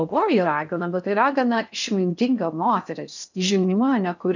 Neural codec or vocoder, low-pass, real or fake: codec, 16 kHz in and 24 kHz out, 0.6 kbps, FocalCodec, streaming, 4096 codes; 7.2 kHz; fake